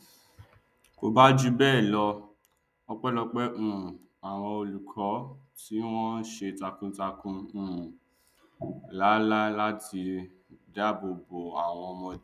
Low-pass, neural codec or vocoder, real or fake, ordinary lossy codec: 14.4 kHz; none; real; none